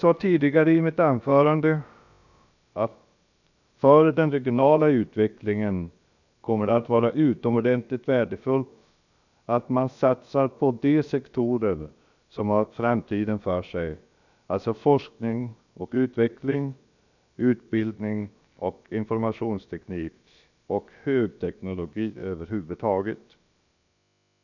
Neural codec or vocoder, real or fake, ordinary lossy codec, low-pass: codec, 16 kHz, about 1 kbps, DyCAST, with the encoder's durations; fake; none; 7.2 kHz